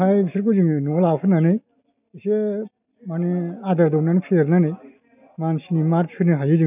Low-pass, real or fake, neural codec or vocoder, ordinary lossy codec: 3.6 kHz; real; none; none